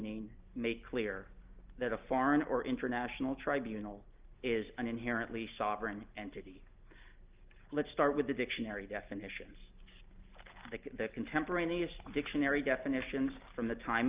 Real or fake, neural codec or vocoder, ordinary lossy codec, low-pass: real; none; Opus, 32 kbps; 3.6 kHz